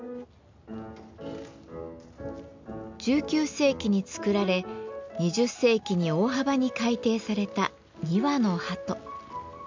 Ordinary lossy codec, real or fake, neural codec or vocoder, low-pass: MP3, 64 kbps; real; none; 7.2 kHz